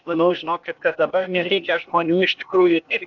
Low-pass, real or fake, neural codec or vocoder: 7.2 kHz; fake; codec, 16 kHz, 0.8 kbps, ZipCodec